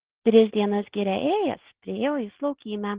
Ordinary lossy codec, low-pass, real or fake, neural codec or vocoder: Opus, 16 kbps; 3.6 kHz; real; none